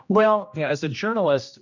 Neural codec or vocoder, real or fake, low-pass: codec, 16 kHz, 1 kbps, X-Codec, HuBERT features, trained on general audio; fake; 7.2 kHz